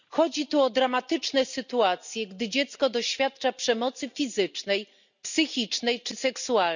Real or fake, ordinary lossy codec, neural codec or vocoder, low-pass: real; none; none; 7.2 kHz